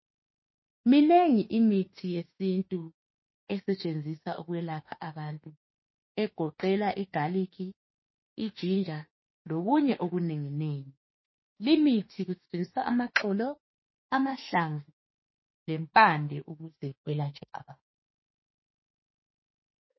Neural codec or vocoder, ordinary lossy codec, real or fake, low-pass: autoencoder, 48 kHz, 32 numbers a frame, DAC-VAE, trained on Japanese speech; MP3, 24 kbps; fake; 7.2 kHz